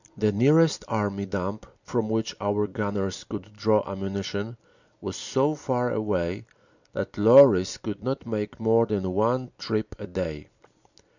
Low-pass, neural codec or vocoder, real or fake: 7.2 kHz; none; real